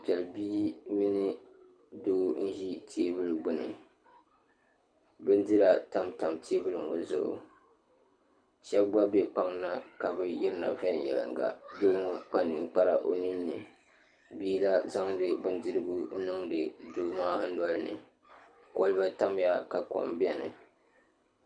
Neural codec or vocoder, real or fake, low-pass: codec, 24 kHz, 6 kbps, HILCodec; fake; 9.9 kHz